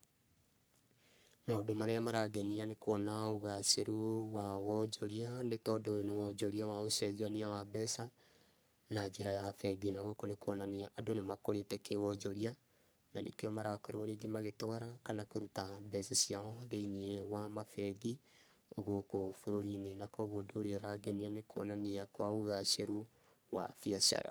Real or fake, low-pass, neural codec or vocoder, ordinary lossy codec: fake; none; codec, 44.1 kHz, 3.4 kbps, Pupu-Codec; none